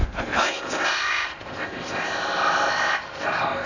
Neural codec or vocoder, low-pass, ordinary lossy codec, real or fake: codec, 16 kHz in and 24 kHz out, 0.6 kbps, FocalCodec, streaming, 4096 codes; 7.2 kHz; none; fake